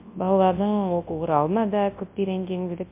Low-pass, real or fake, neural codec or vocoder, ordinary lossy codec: 3.6 kHz; fake; codec, 24 kHz, 0.9 kbps, WavTokenizer, large speech release; MP3, 24 kbps